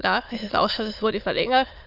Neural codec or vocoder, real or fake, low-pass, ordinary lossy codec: autoencoder, 22.05 kHz, a latent of 192 numbers a frame, VITS, trained on many speakers; fake; 5.4 kHz; none